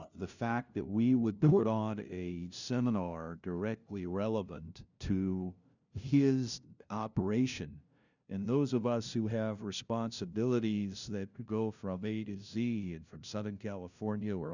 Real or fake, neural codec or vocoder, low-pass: fake; codec, 16 kHz, 0.5 kbps, FunCodec, trained on LibriTTS, 25 frames a second; 7.2 kHz